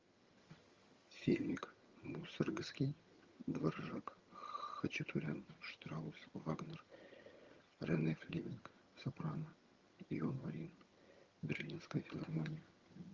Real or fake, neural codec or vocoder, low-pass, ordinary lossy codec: fake; vocoder, 22.05 kHz, 80 mel bands, HiFi-GAN; 7.2 kHz; Opus, 32 kbps